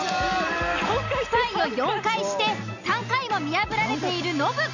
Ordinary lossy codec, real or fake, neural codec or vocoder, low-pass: none; real; none; 7.2 kHz